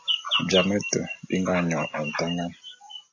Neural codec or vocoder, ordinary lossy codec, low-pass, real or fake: none; AAC, 48 kbps; 7.2 kHz; real